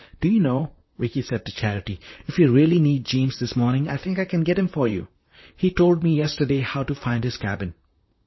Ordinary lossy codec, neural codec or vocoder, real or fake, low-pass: MP3, 24 kbps; vocoder, 44.1 kHz, 128 mel bands, Pupu-Vocoder; fake; 7.2 kHz